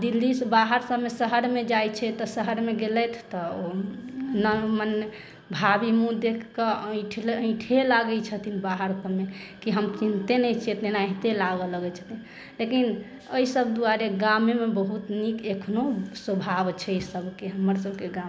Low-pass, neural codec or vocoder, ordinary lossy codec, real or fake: none; none; none; real